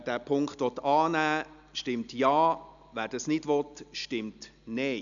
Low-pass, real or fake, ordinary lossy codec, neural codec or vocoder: 7.2 kHz; real; none; none